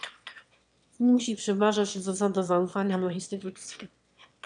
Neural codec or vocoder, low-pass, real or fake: autoencoder, 22.05 kHz, a latent of 192 numbers a frame, VITS, trained on one speaker; 9.9 kHz; fake